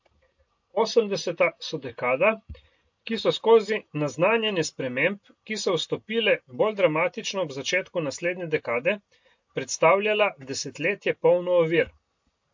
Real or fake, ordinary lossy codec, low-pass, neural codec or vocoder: real; MP3, 48 kbps; 7.2 kHz; none